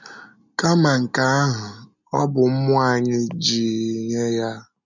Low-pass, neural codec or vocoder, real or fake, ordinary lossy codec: 7.2 kHz; none; real; none